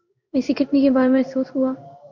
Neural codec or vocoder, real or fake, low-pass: codec, 16 kHz in and 24 kHz out, 1 kbps, XY-Tokenizer; fake; 7.2 kHz